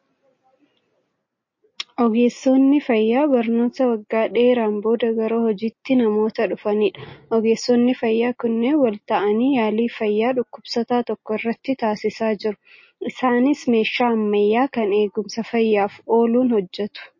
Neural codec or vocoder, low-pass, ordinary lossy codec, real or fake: none; 7.2 kHz; MP3, 32 kbps; real